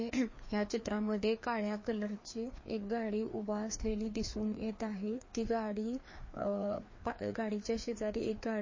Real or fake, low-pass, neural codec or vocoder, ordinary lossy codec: fake; 7.2 kHz; codec, 16 kHz, 2 kbps, FreqCodec, larger model; MP3, 32 kbps